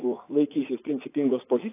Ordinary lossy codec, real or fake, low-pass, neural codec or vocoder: AAC, 24 kbps; fake; 3.6 kHz; vocoder, 44.1 kHz, 80 mel bands, Vocos